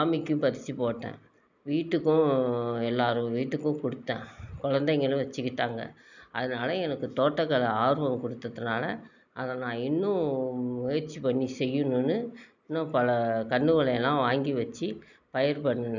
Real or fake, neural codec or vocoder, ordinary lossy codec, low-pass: real; none; none; 7.2 kHz